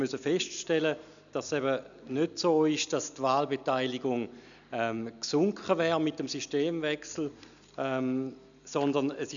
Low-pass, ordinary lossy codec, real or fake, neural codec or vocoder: 7.2 kHz; none; real; none